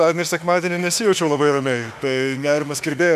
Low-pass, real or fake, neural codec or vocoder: 14.4 kHz; fake; autoencoder, 48 kHz, 32 numbers a frame, DAC-VAE, trained on Japanese speech